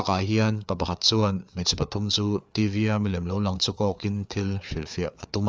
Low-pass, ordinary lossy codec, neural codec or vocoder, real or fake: none; none; codec, 16 kHz, 4 kbps, FreqCodec, larger model; fake